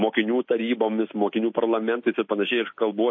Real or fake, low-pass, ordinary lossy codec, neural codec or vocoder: real; 7.2 kHz; MP3, 32 kbps; none